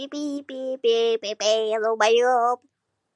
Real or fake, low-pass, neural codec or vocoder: real; 10.8 kHz; none